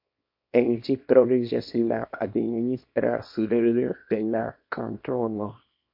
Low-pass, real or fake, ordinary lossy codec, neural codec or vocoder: 5.4 kHz; fake; MP3, 32 kbps; codec, 24 kHz, 0.9 kbps, WavTokenizer, small release